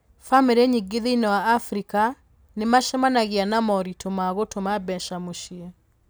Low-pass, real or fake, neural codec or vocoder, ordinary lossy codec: none; real; none; none